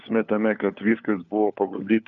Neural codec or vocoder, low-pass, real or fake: codec, 16 kHz, 4 kbps, FunCodec, trained on LibriTTS, 50 frames a second; 7.2 kHz; fake